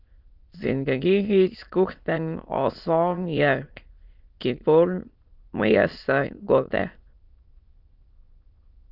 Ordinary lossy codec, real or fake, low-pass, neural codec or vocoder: Opus, 24 kbps; fake; 5.4 kHz; autoencoder, 22.05 kHz, a latent of 192 numbers a frame, VITS, trained on many speakers